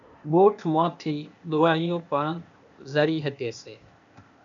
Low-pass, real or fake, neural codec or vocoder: 7.2 kHz; fake; codec, 16 kHz, 0.8 kbps, ZipCodec